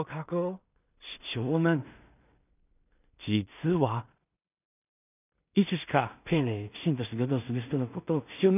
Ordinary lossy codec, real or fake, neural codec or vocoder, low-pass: none; fake; codec, 16 kHz in and 24 kHz out, 0.4 kbps, LongCat-Audio-Codec, two codebook decoder; 3.6 kHz